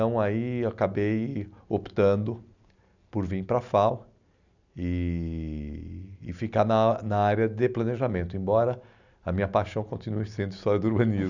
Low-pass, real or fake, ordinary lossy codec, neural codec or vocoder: 7.2 kHz; real; none; none